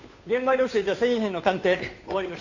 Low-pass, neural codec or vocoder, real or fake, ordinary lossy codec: 7.2 kHz; codec, 16 kHz, 2 kbps, FunCodec, trained on Chinese and English, 25 frames a second; fake; none